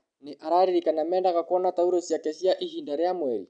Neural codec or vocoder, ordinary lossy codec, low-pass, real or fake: none; none; 9.9 kHz; real